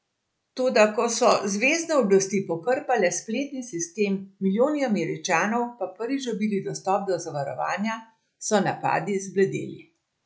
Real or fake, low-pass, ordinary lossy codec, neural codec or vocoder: real; none; none; none